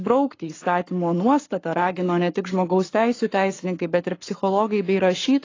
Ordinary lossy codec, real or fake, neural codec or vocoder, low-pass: AAC, 32 kbps; fake; codec, 44.1 kHz, 7.8 kbps, Pupu-Codec; 7.2 kHz